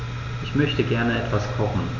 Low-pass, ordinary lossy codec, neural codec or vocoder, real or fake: 7.2 kHz; none; none; real